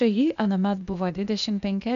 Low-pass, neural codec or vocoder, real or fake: 7.2 kHz; codec, 16 kHz, 0.8 kbps, ZipCodec; fake